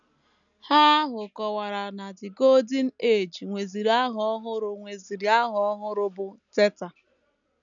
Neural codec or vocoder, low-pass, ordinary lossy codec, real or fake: none; 7.2 kHz; none; real